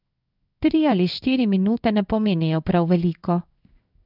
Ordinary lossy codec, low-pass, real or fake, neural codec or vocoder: none; 5.4 kHz; fake; codec, 16 kHz in and 24 kHz out, 1 kbps, XY-Tokenizer